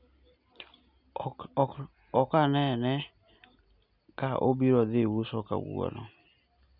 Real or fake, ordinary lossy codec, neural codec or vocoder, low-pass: real; none; none; 5.4 kHz